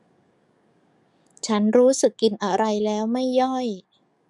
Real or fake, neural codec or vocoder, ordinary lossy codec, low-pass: fake; codec, 44.1 kHz, 7.8 kbps, DAC; none; 10.8 kHz